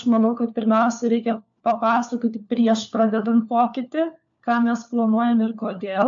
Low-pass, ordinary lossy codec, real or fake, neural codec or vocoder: 7.2 kHz; AAC, 64 kbps; fake; codec, 16 kHz, 4 kbps, FunCodec, trained on LibriTTS, 50 frames a second